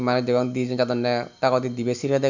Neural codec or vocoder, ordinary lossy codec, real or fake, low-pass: none; none; real; 7.2 kHz